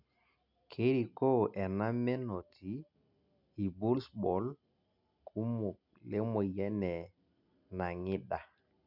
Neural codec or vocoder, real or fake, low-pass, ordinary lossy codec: none; real; 5.4 kHz; none